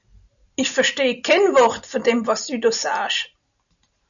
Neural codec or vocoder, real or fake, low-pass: none; real; 7.2 kHz